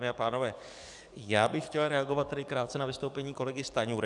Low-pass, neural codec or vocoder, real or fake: 10.8 kHz; codec, 44.1 kHz, 7.8 kbps, DAC; fake